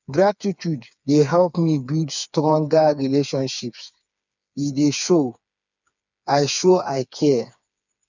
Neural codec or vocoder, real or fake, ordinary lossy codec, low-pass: codec, 16 kHz, 4 kbps, FreqCodec, smaller model; fake; none; 7.2 kHz